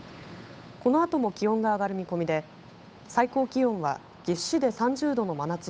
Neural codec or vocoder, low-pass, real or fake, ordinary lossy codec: codec, 16 kHz, 8 kbps, FunCodec, trained on Chinese and English, 25 frames a second; none; fake; none